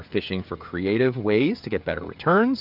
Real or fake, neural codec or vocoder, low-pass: fake; codec, 16 kHz, 16 kbps, FreqCodec, smaller model; 5.4 kHz